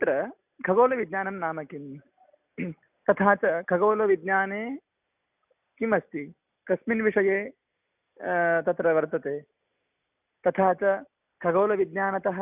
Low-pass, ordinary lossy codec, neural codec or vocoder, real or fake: 3.6 kHz; none; none; real